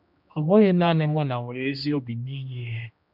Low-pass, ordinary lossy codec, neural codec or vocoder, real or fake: 5.4 kHz; none; codec, 16 kHz, 1 kbps, X-Codec, HuBERT features, trained on general audio; fake